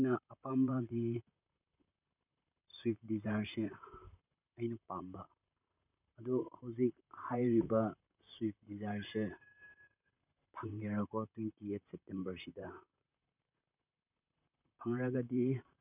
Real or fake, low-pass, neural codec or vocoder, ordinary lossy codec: fake; 3.6 kHz; vocoder, 44.1 kHz, 128 mel bands, Pupu-Vocoder; none